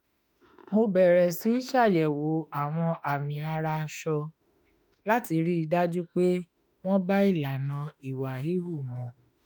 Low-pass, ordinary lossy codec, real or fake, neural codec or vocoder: none; none; fake; autoencoder, 48 kHz, 32 numbers a frame, DAC-VAE, trained on Japanese speech